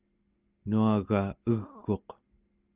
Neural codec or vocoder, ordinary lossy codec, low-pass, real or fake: none; Opus, 24 kbps; 3.6 kHz; real